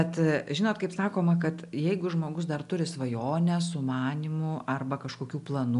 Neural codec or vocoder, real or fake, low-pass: none; real; 10.8 kHz